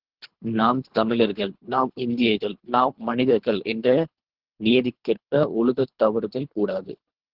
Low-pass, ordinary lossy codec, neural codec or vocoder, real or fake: 5.4 kHz; Opus, 16 kbps; codec, 24 kHz, 3 kbps, HILCodec; fake